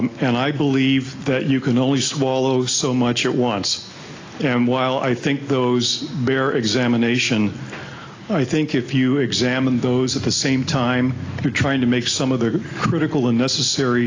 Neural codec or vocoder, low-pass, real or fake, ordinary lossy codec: none; 7.2 kHz; real; AAC, 32 kbps